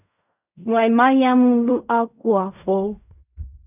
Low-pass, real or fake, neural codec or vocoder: 3.6 kHz; fake; codec, 16 kHz in and 24 kHz out, 0.4 kbps, LongCat-Audio-Codec, fine tuned four codebook decoder